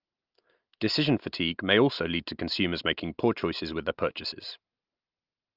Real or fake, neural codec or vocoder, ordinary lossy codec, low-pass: real; none; Opus, 24 kbps; 5.4 kHz